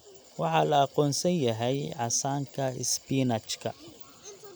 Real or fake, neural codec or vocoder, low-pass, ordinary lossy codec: real; none; none; none